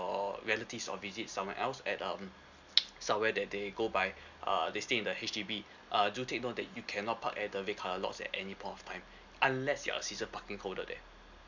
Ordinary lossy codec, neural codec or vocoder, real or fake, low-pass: none; none; real; 7.2 kHz